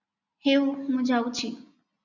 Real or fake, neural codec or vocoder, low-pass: real; none; 7.2 kHz